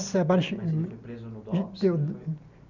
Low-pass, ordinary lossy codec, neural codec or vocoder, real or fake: 7.2 kHz; none; none; real